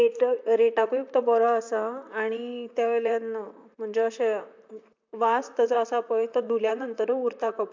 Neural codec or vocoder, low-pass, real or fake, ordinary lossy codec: vocoder, 44.1 kHz, 128 mel bands, Pupu-Vocoder; 7.2 kHz; fake; MP3, 64 kbps